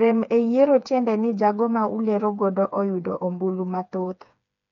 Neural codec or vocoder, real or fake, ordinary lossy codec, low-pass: codec, 16 kHz, 4 kbps, FreqCodec, smaller model; fake; none; 7.2 kHz